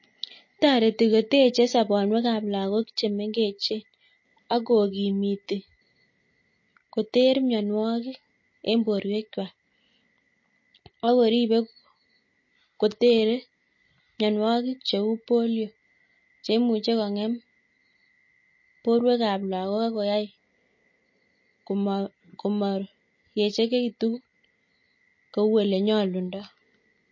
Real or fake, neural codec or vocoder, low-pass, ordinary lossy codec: real; none; 7.2 kHz; MP3, 32 kbps